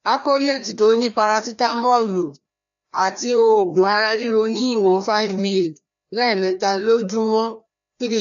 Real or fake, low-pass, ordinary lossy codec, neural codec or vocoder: fake; 7.2 kHz; none; codec, 16 kHz, 1 kbps, FreqCodec, larger model